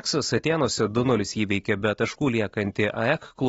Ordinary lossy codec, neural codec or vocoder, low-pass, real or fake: AAC, 24 kbps; none; 19.8 kHz; real